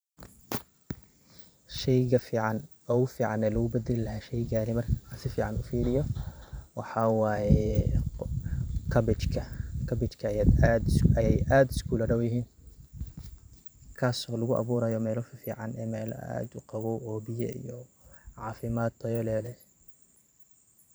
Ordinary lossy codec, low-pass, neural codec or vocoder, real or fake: none; none; vocoder, 44.1 kHz, 128 mel bands every 512 samples, BigVGAN v2; fake